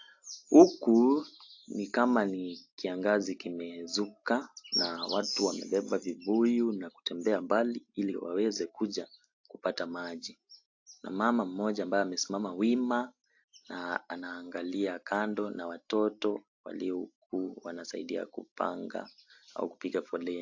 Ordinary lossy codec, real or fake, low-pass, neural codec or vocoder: AAC, 48 kbps; real; 7.2 kHz; none